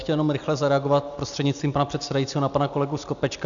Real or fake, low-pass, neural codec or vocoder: real; 7.2 kHz; none